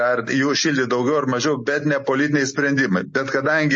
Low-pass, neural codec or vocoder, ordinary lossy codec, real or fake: 9.9 kHz; none; MP3, 32 kbps; real